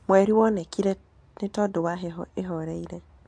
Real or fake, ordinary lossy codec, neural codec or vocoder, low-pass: real; MP3, 64 kbps; none; 9.9 kHz